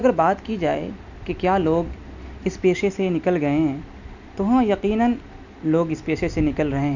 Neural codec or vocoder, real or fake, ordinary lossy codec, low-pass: none; real; none; 7.2 kHz